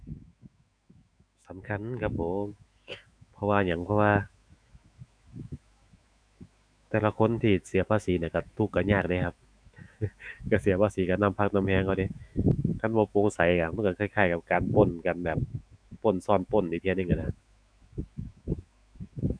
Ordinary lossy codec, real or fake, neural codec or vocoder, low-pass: none; fake; autoencoder, 48 kHz, 128 numbers a frame, DAC-VAE, trained on Japanese speech; 9.9 kHz